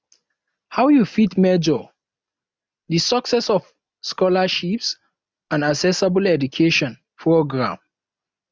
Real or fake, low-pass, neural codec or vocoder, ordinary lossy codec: real; none; none; none